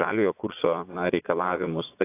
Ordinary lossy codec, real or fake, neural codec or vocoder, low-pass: AAC, 24 kbps; fake; vocoder, 22.05 kHz, 80 mel bands, Vocos; 3.6 kHz